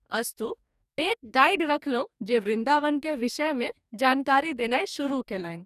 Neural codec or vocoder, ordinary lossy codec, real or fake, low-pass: codec, 44.1 kHz, 2.6 kbps, DAC; none; fake; 14.4 kHz